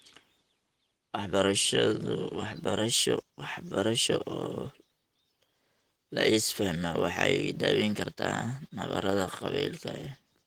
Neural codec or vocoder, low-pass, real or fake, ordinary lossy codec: vocoder, 44.1 kHz, 128 mel bands, Pupu-Vocoder; 19.8 kHz; fake; Opus, 24 kbps